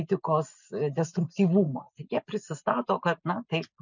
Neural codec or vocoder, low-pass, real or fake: none; 7.2 kHz; real